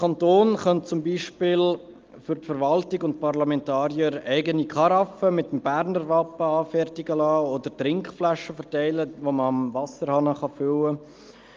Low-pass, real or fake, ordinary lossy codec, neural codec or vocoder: 7.2 kHz; real; Opus, 32 kbps; none